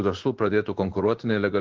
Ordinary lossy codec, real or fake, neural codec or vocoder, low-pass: Opus, 16 kbps; fake; codec, 16 kHz in and 24 kHz out, 1 kbps, XY-Tokenizer; 7.2 kHz